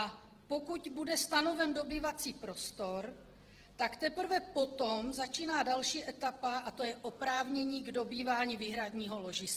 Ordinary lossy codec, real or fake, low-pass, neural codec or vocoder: Opus, 16 kbps; fake; 14.4 kHz; vocoder, 48 kHz, 128 mel bands, Vocos